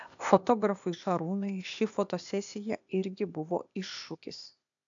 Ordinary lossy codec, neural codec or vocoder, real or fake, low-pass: AAC, 64 kbps; codec, 16 kHz, 0.8 kbps, ZipCodec; fake; 7.2 kHz